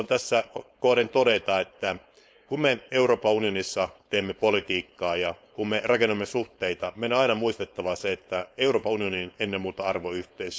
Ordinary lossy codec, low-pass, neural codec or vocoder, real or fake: none; none; codec, 16 kHz, 4.8 kbps, FACodec; fake